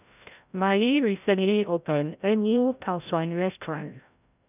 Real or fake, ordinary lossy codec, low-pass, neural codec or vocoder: fake; none; 3.6 kHz; codec, 16 kHz, 0.5 kbps, FreqCodec, larger model